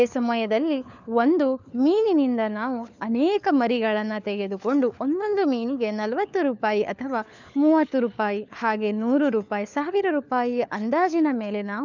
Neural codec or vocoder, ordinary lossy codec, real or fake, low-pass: codec, 16 kHz, 4 kbps, FunCodec, trained on LibriTTS, 50 frames a second; none; fake; 7.2 kHz